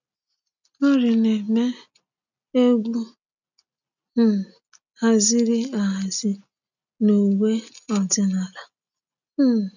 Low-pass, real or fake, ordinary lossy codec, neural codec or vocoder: 7.2 kHz; real; none; none